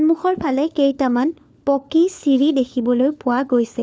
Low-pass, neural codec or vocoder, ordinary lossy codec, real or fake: none; codec, 16 kHz, 4 kbps, FunCodec, trained on LibriTTS, 50 frames a second; none; fake